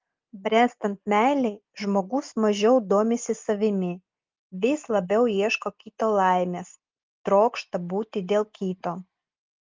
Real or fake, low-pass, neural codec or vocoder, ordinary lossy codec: real; 7.2 kHz; none; Opus, 32 kbps